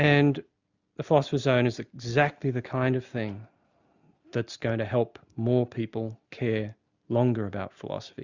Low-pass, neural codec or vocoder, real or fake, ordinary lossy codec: 7.2 kHz; none; real; Opus, 64 kbps